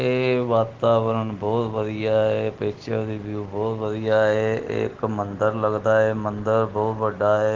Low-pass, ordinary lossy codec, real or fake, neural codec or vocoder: 7.2 kHz; Opus, 16 kbps; real; none